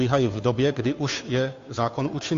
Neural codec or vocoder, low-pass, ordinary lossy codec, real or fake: codec, 16 kHz, 2 kbps, FunCodec, trained on Chinese and English, 25 frames a second; 7.2 kHz; AAC, 48 kbps; fake